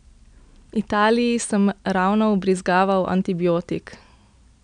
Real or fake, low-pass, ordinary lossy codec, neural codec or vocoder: real; 9.9 kHz; none; none